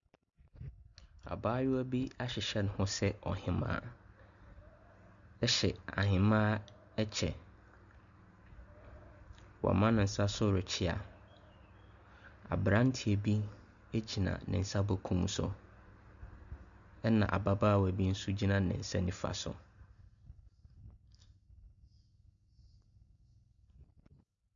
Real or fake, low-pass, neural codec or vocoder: real; 7.2 kHz; none